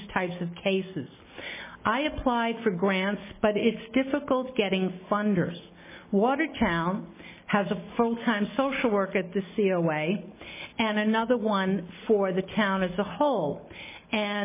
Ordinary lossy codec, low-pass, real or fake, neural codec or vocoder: MP3, 16 kbps; 3.6 kHz; real; none